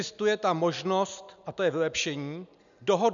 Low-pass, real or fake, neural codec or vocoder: 7.2 kHz; real; none